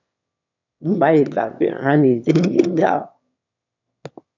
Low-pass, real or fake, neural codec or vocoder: 7.2 kHz; fake; autoencoder, 22.05 kHz, a latent of 192 numbers a frame, VITS, trained on one speaker